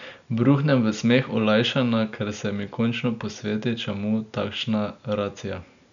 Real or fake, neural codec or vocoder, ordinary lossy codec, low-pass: real; none; none; 7.2 kHz